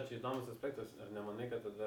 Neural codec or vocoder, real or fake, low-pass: none; real; 19.8 kHz